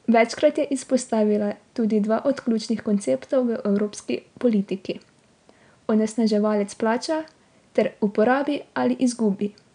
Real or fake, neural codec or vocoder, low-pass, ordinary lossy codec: real; none; 9.9 kHz; none